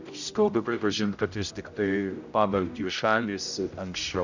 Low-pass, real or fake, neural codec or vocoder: 7.2 kHz; fake; codec, 16 kHz, 0.5 kbps, X-Codec, HuBERT features, trained on general audio